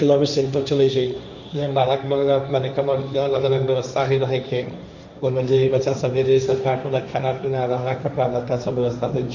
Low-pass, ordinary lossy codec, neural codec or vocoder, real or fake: 7.2 kHz; none; codec, 16 kHz, 1.1 kbps, Voila-Tokenizer; fake